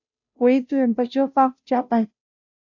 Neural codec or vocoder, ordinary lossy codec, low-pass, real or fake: codec, 16 kHz, 0.5 kbps, FunCodec, trained on Chinese and English, 25 frames a second; AAC, 48 kbps; 7.2 kHz; fake